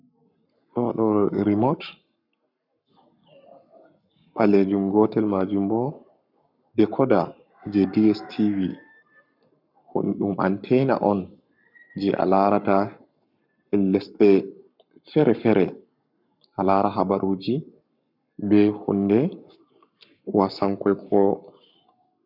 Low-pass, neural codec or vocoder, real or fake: 5.4 kHz; codec, 44.1 kHz, 7.8 kbps, Pupu-Codec; fake